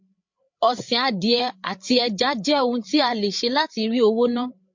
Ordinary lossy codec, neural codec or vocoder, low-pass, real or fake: MP3, 48 kbps; codec, 16 kHz, 8 kbps, FreqCodec, larger model; 7.2 kHz; fake